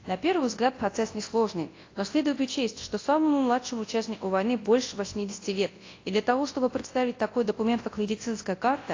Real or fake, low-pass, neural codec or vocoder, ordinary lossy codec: fake; 7.2 kHz; codec, 24 kHz, 0.9 kbps, WavTokenizer, large speech release; AAC, 32 kbps